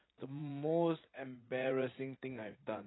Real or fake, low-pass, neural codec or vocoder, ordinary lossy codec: fake; 7.2 kHz; vocoder, 44.1 kHz, 80 mel bands, Vocos; AAC, 16 kbps